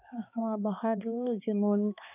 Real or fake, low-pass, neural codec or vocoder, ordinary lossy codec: fake; 3.6 kHz; codec, 16 kHz, 2 kbps, X-Codec, HuBERT features, trained on balanced general audio; none